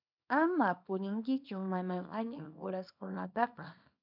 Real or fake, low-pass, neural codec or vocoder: fake; 5.4 kHz; codec, 24 kHz, 0.9 kbps, WavTokenizer, small release